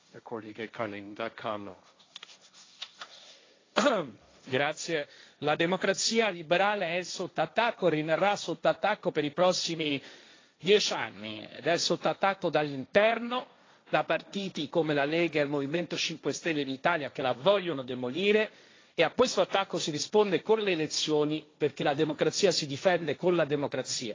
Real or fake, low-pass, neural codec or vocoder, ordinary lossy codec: fake; 7.2 kHz; codec, 16 kHz, 1.1 kbps, Voila-Tokenizer; AAC, 32 kbps